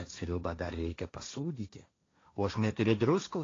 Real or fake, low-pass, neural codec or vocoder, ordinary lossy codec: fake; 7.2 kHz; codec, 16 kHz, 1.1 kbps, Voila-Tokenizer; AAC, 32 kbps